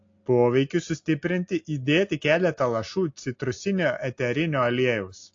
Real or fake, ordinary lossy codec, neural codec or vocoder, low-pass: real; AAC, 48 kbps; none; 7.2 kHz